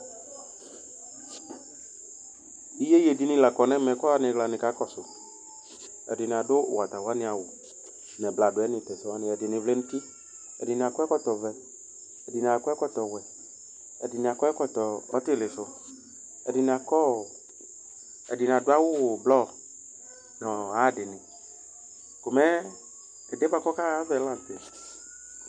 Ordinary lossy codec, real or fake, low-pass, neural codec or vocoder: MP3, 96 kbps; real; 9.9 kHz; none